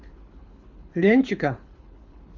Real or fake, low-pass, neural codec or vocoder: fake; 7.2 kHz; codec, 24 kHz, 6 kbps, HILCodec